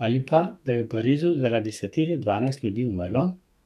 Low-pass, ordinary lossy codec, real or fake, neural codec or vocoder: 14.4 kHz; none; fake; codec, 32 kHz, 1.9 kbps, SNAC